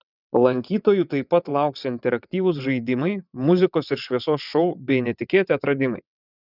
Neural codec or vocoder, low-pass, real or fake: vocoder, 22.05 kHz, 80 mel bands, WaveNeXt; 5.4 kHz; fake